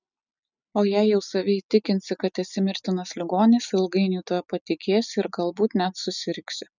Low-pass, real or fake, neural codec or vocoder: 7.2 kHz; real; none